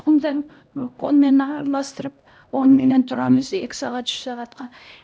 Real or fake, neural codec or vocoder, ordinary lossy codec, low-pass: fake; codec, 16 kHz, 1 kbps, X-Codec, HuBERT features, trained on LibriSpeech; none; none